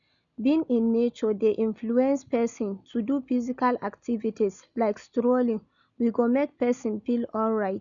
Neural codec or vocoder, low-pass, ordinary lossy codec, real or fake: none; 7.2 kHz; none; real